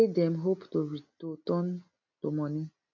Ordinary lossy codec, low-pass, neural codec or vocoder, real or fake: none; 7.2 kHz; none; real